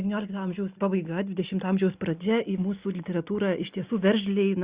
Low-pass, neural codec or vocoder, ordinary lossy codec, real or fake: 3.6 kHz; none; Opus, 64 kbps; real